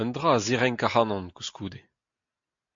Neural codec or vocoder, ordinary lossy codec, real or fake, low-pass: none; MP3, 48 kbps; real; 7.2 kHz